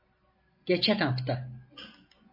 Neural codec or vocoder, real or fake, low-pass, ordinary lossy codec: none; real; 5.4 kHz; MP3, 24 kbps